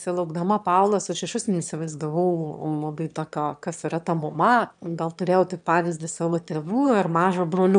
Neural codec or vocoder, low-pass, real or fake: autoencoder, 22.05 kHz, a latent of 192 numbers a frame, VITS, trained on one speaker; 9.9 kHz; fake